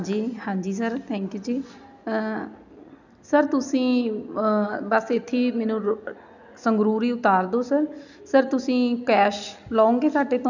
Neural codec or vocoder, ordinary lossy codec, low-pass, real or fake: none; none; 7.2 kHz; real